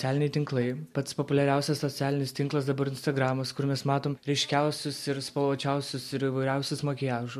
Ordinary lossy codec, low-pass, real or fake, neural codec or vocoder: MP3, 64 kbps; 14.4 kHz; real; none